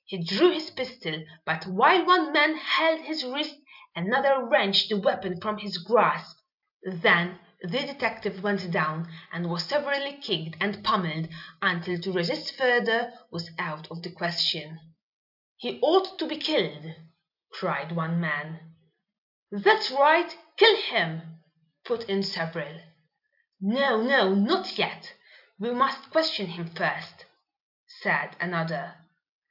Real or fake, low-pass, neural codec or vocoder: real; 5.4 kHz; none